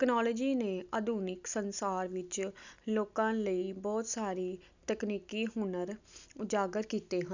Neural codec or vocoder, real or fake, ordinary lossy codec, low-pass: none; real; none; 7.2 kHz